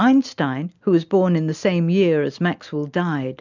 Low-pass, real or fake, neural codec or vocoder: 7.2 kHz; real; none